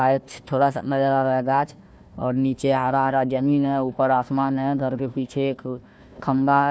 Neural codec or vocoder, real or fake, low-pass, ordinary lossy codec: codec, 16 kHz, 1 kbps, FunCodec, trained on Chinese and English, 50 frames a second; fake; none; none